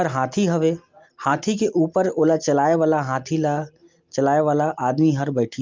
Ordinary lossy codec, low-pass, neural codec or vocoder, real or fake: Opus, 24 kbps; 7.2 kHz; none; real